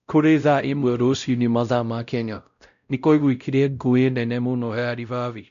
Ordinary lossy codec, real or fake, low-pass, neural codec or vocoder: none; fake; 7.2 kHz; codec, 16 kHz, 0.5 kbps, X-Codec, WavLM features, trained on Multilingual LibriSpeech